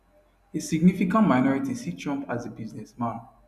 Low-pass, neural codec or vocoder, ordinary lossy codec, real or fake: 14.4 kHz; none; none; real